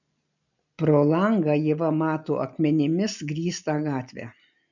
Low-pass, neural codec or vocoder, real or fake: 7.2 kHz; none; real